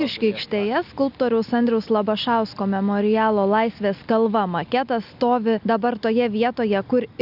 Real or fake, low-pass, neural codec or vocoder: real; 5.4 kHz; none